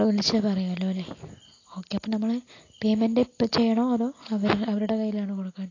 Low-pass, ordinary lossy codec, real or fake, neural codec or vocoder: 7.2 kHz; AAC, 32 kbps; real; none